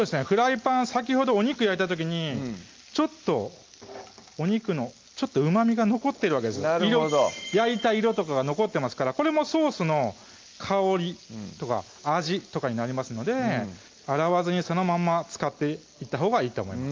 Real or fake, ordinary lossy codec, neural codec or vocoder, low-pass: real; Opus, 32 kbps; none; 7.2 kHz